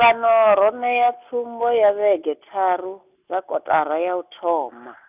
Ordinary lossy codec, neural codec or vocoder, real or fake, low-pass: none; none; real; 3.6 kHz